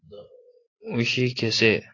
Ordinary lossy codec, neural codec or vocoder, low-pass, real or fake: AAC, 32 kbps; none; 7.2 kHz; real